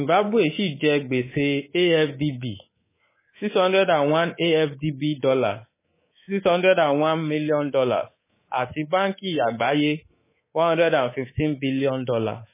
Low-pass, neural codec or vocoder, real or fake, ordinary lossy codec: 3.6 kHz; codec, 24 kHz, 3.1 kbps, DualCodec; fake; MP3, 16 kbps